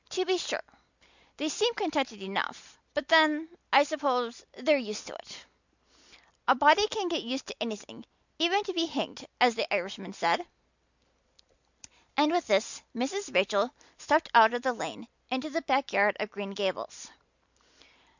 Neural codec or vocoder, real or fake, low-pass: none; real; 7.2 kHz